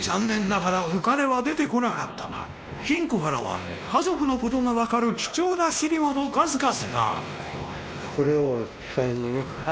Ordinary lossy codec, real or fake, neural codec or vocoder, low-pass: none; fake; codec, 16 kHz, 1 kbps, X-Codec, WavLM features, trained on Multilingual LibriSpeech; none